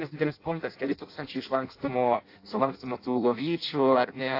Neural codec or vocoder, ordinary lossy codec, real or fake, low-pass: codec, 16 kHz in and 24 kHz out, 0.6 kbps, FireRedTTS-2 codec; AAC, 32 kbps; fake; 5.4 kHz